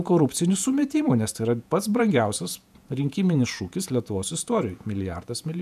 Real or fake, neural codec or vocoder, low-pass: fake; vocoder, 48 kHz, 128 mel bands, Vocos; 14.4 kHz